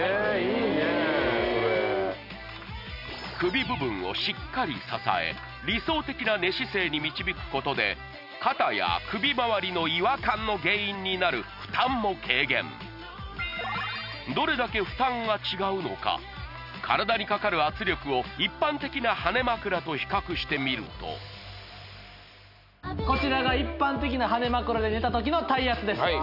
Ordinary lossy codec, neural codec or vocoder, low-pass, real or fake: none; none; 5.4 kHz; real